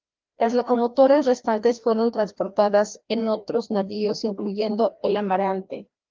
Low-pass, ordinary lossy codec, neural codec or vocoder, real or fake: 7.2 kHz; Opus, 32 kbps; codec, 16 kHz, 1 kbps, FreqCodec, larger model; fake